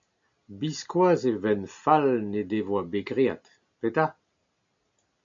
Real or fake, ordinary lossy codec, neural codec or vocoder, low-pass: real; MP3, 96 kbps; none; 7.2 kHz